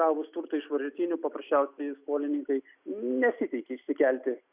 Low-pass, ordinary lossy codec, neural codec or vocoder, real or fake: 3.6 kHz; Opus, 64 kbps; none; real